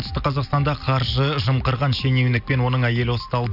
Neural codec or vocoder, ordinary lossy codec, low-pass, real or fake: none; none; 5.4 kHz; real